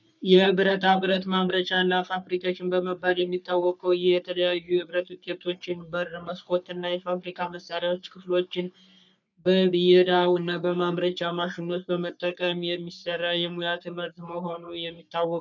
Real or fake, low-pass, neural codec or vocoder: fake; 7.2 kHz; codec, 44.1 kHz, 3.4 kbps, Pupu-Codec